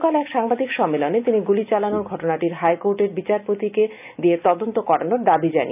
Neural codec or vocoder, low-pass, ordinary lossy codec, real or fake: none; 3.6 kHz; none; real